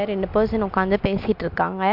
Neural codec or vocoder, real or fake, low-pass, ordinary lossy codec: none; real; 5.4 kHz; MP3, 48 kbps